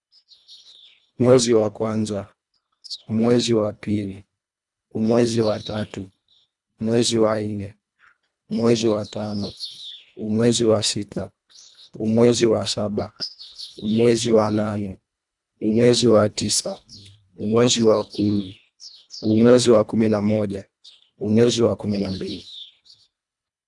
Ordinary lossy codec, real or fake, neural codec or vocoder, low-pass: none; fake; codec, 24 kHz, 1.5 kbps, HILCodec; 10.8 kHz